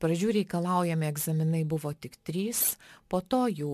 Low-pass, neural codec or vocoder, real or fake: 14.4 kHz; none; real